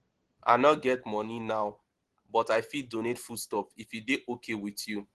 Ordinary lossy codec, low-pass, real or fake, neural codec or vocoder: Opus, 16 kbps; 10.8 kHz; real; none